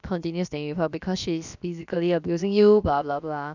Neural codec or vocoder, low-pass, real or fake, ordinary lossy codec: codec, 16 kHz, about 1 kbps, DyCAST, with the encoder's durations; 7.2 kHz; fake; none